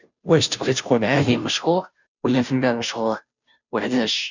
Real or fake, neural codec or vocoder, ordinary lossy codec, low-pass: fake; codec, 16 kHz, 0.5 kbps, FunCodec, trained on Chinese and English, 25 frames a second; none; 7.2 kHz